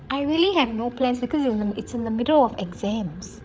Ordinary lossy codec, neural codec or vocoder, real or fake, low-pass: none; codec, 16 kHz, 8 kbps, FreqCodec, larger model; fake; none